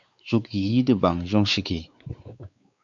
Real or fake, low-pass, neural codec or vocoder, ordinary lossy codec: fake; 7.2 kHz; codec, 16 kHz, 4 kbps, X-Codec, WavLM features, trained on Multilingual LibriSpeech; MP3, 64 kbps